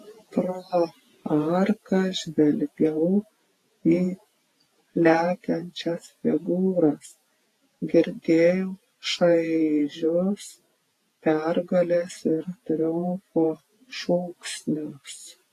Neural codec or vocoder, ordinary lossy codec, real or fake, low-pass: vocoder, 48 kHz, 128 mel bands, Vocos; AAC, 48 kbps; fake; 14.4 kHz